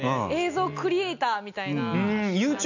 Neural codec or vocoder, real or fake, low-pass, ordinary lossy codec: none; real; 7.2 kHz; none